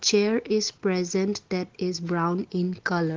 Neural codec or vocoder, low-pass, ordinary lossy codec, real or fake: none; 7.2 kHz; Opus, 16 kbps; real